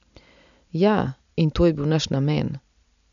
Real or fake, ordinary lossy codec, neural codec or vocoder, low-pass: real; none; none; 7.2 kHz